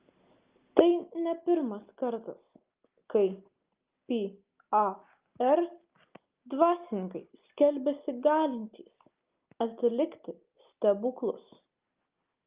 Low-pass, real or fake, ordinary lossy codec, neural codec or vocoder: 3.6 kHz; real; Opus, 32 kbps; none